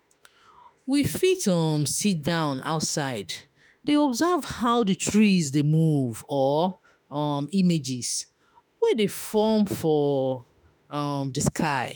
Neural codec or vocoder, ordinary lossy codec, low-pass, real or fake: autoencoder, 48 kHz, 32 numbers a frame, DAC-VAE, trained on Japanese speech; none; none; fake